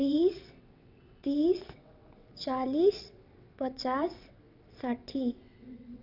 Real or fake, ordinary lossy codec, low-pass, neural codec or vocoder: fake; none; 5.4 kHz; vocoder, 22.05 kHz, 80 mel bands, WaveNeXt